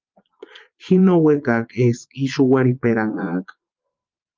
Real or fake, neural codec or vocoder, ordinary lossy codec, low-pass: fake; vocoder, 22.05 kHz, 80 mel bands, Vocos; Opus, 32 kbps; 7.2 kHz